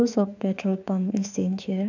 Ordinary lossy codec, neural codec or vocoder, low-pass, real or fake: none; autoencoder, 48 kHz, 32 numbers a frame, DAC-VAE, trained on Japanese speech; 7.2 kHz; fake